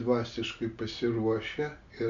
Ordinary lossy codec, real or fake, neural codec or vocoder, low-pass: MP3, 48 kbps; real; none; 7.2 kHz